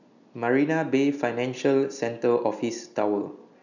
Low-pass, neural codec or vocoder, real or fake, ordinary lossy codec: 7.2 kHz; none; real; none